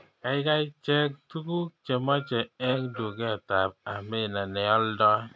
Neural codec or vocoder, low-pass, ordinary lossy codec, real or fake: none; none; none; real